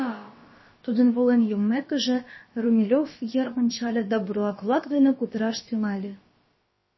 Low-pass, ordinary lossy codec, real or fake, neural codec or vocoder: 7.2 kHz; MP3, 24 kbps; fake; codec, 16 kHz, about 1 kbps, DyCAST, with the encoder's durations